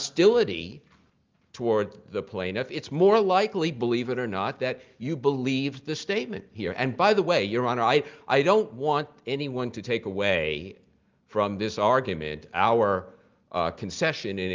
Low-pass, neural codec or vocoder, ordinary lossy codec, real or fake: 7.2 kHz; none; Opus, 32 kbps; real